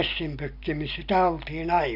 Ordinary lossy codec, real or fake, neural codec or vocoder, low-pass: none; real; none; 5.4 kHz